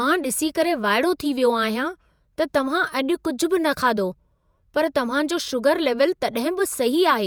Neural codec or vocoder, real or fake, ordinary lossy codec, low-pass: vocoder, 48 kHz, 128 mel bands, Vocos; fake; none; none